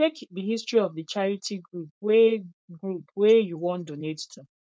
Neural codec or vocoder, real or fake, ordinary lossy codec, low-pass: codec, 16 kHz, 4.8 kbps, FACodec; fake; none; none